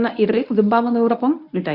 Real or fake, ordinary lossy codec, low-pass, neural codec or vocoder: fake; none; 5.4 kHz; codec, 24 kHz, 0.9 kbps, WavTokenizer, medium speech release version 1